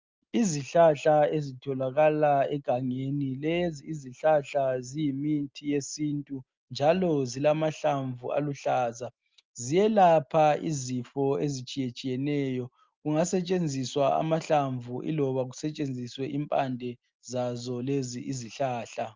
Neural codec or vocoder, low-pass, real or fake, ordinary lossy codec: none; 7.2 kHz; real; Opus, 32 kbps